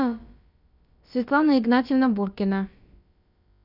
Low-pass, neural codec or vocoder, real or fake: 5.4 kHz; codec, 16 kHz, about 1 kbps, DyCAST, with the encoder's durations; fake